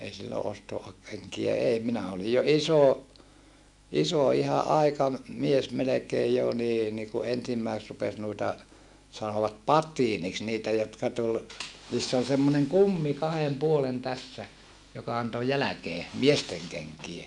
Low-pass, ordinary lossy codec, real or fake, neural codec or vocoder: 10.8 kHz; none; fake; autoencoder, 48 kHz, 128 numbers a frame, DAC-VAE, trained on Japanese speech